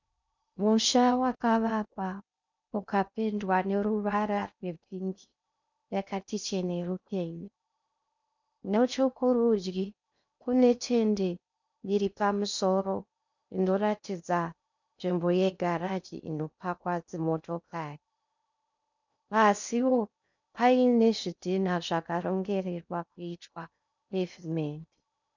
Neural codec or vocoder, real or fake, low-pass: codec, 16 kHz in and 24 kHz out, 0.6 kbps, FocalCodec, streaming, 2048 codes; fake; 7.2 kHz